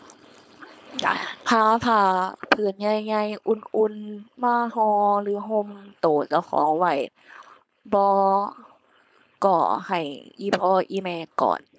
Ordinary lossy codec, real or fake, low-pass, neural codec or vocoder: none; fake; none; codec, 16 kHz, 4.8 kbps, FACodec